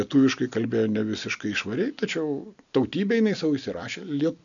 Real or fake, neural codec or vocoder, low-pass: real; none; 7.2 kHz